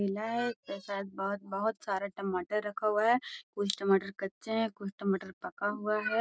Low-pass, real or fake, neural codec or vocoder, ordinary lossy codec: none; real; none; none